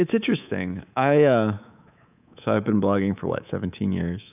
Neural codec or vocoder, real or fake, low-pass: codec, 24 kHz, 3.1 kbps, DualCodec; fake; 3.6 kHz